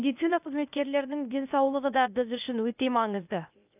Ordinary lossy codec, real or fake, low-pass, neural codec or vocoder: none; fake; 3.6 kHz; codec, 16 kHz, 0.8 kbps, ZipCodec